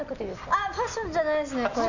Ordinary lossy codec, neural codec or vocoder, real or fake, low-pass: none; none; real; 7.2 kHz